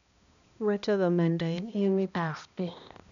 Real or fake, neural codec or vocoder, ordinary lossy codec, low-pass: fake; codec, 16 kHz, 1 kbps, X-Codec, HuBERT features, trained on balanced general audio; none; 7.2 kHz